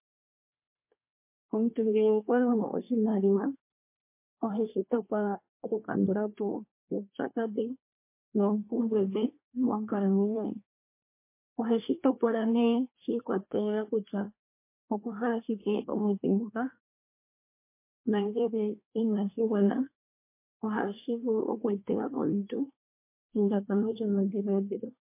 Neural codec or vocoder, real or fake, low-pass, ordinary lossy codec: codec, 24 kHz, 1 kbps, SNAC; fake; 3.6 kHz; MP3, 24 kbps